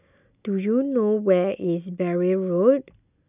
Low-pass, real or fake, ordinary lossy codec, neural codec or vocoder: 3.6 kHz; real; none; none